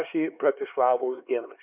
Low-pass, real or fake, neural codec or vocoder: 3.6 kHz; fake; codec, 16 kHz, 4 kbps, X-Codec, HuBERT features, trained on LibriSpeech